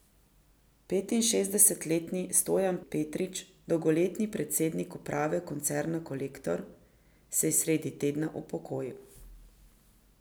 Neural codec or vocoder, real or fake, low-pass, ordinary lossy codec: none; real; none; none